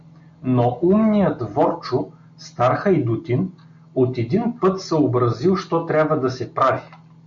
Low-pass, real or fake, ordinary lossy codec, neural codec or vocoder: 7.2 kHz; real; MP3, 48 kbps; none